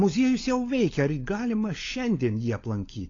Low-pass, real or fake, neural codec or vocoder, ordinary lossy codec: 7.2 kHz; fake; codec, 16 kHz, 16 kbps, FunCodec, trained on LibriTTS, 50 frames a second; AAC, 32 kbps